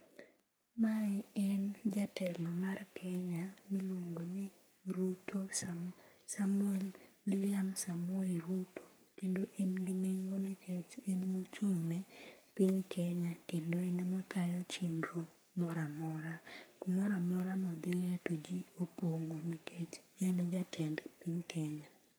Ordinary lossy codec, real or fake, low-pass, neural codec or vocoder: none; fake; none; codec, 44.1 kHz, 3.4 kbps, Pupu-Codec